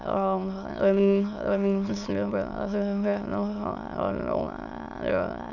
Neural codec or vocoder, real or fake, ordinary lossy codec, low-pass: autoencoder, 22.05 kHz, a latent of 192 numbers a frame, VITS, trained on many speakers; fake; none; 7.2 kHz